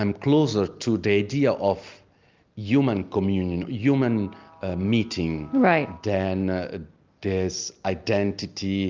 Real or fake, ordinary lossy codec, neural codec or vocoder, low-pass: real; Opus, 24 kbps; none; 7.2 kHz